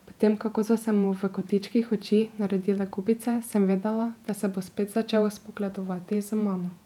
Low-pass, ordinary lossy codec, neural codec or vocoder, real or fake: 19.8 kHz; none; vocoder, 48 kHz, 128 mel bands, Vocos; fake